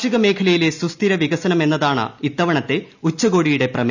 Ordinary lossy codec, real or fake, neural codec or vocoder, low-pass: none; real; none; 7.2 kHz